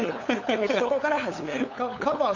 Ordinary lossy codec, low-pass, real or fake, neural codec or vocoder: none; 7.2 kHz; fake; codec, 16 kHz, 8 kbps, FunCodec, trained on LibriTTS, 25 frames a second